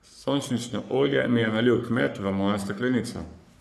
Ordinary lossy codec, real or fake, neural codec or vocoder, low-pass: none; fake; codec, 44.1 kHz, 3.4 kbps, Pupu-Codec; 14.4 kHz